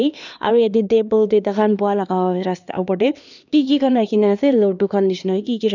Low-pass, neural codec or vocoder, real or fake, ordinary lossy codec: 7.2 kHz; codec, 16 kHz, 2 kbps, X-Codec, HuBERT features, trained on LibriSpeech; fake; none